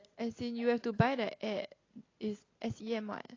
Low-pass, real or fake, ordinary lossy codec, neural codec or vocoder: 7.2 kHz; real; AAC, 32 kbps; none